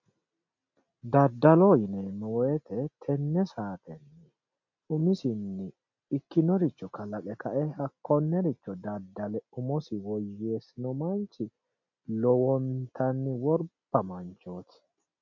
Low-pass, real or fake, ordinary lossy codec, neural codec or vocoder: 7.2 kHz; real; MP3, 48 kbps; none